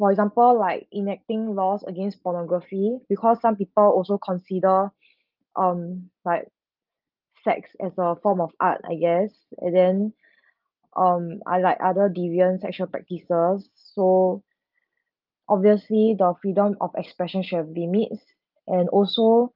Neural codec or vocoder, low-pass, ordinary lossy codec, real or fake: none; 5.4 kHz; Opus, 24 kbps; real